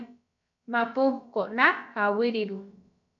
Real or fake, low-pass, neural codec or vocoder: fake; 7.2 kHz; codec, 16 kHz, about 1 kbps, DyCAST, with the encoder's durations